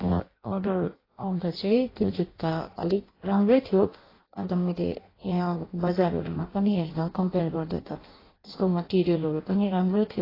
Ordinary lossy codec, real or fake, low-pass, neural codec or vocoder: AAC, 24 kbps; fake; 5.4 kHz; codec, 16 kHz in and 24 kHz out, 0.6 kbps, FireRedTTS-2 codec